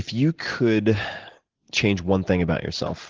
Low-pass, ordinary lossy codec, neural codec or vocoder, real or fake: 7.2 kHz; Opus, 16 kbps; none; real